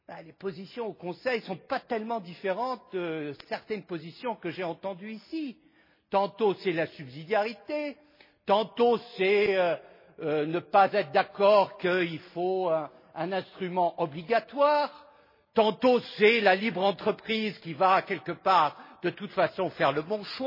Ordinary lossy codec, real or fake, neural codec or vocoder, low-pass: MP3, 24 kbps; real; none; 5.4 kHz